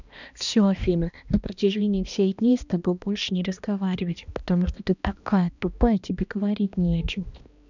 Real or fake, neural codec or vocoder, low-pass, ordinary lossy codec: fake; codec, 16 kHz, 1 kbps, X-Codec, HuBERT features, trained on balanced general audio; 7.2 kHz; none